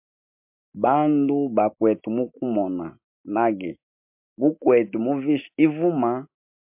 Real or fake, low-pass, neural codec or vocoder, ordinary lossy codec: real; 3.6 kHz; none; MP3, 32 kbps